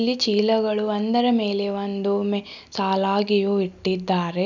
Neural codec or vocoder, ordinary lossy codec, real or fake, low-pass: none; none; real; 7.2 kHz